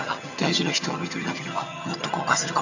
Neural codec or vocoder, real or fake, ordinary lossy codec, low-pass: vocoder, 22.05 kHz, 80 mel bands, HiFi-GAN; fake; none; 7.2 kHz